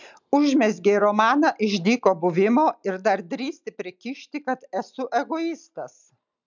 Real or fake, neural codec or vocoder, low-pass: real; none; 7.2 kHz